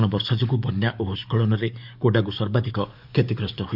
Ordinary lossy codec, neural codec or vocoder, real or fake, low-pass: none; codec, 44.1 kHz, 7.8 kbps, DAC; fake; 5.4 kHz